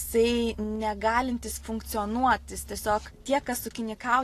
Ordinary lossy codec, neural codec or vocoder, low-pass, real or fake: AAC, 48 kbps; none; 14.4 kHz; real